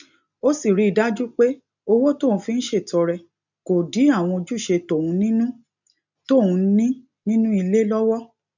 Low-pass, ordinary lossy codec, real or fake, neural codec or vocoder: 7.2 kHz; none; real; none